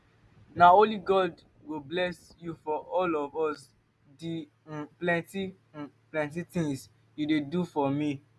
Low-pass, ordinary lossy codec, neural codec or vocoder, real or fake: none; none; none; real